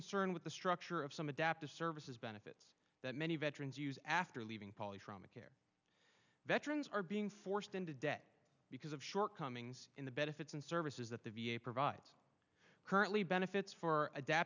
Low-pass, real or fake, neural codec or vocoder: 7.2 kHz; real; none